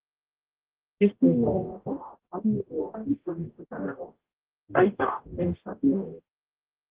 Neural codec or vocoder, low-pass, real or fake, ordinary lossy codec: codec, 44.1 kHz, 0.9 kbps, DAC; 3.6 kHz; fake; Opus, 16 kbps